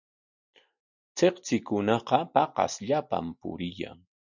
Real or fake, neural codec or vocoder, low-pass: real; none; 7.2 kHz